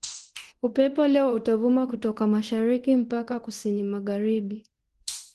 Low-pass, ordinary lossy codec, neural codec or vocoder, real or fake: 10.8 kHz; Opus, 16 kbps; codec, 24 kHz, 0.9 kbps, DualCodec; fake